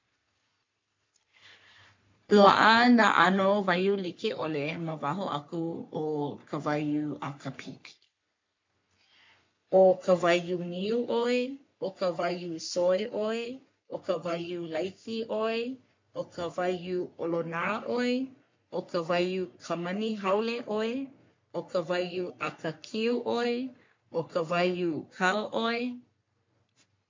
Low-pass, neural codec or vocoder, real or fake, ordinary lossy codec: 7.2 kHz; codec, 44.1 kHz, 3.4 kbps, Pupu-Codec; fake; MP3, 48 kbps